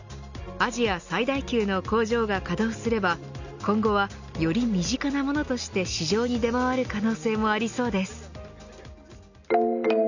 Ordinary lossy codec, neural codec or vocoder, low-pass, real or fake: none; none; 7.2 kHz; real